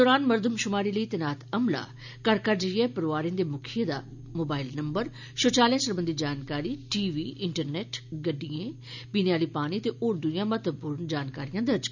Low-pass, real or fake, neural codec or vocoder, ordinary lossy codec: 7.2 kHz; real; none; none